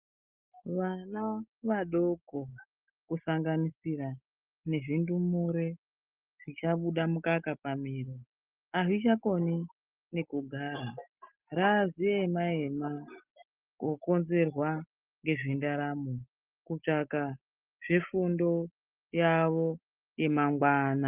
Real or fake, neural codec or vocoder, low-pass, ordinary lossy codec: real; none; 3.6 kHz; Opus, 24 kbps